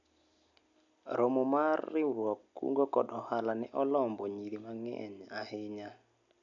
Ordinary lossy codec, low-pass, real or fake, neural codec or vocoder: none; 7.2 kHz; real; none